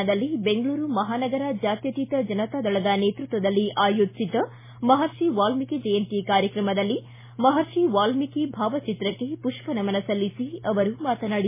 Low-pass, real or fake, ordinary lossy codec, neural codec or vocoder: 3.6 kHz; real; MP3, 16 kbps; none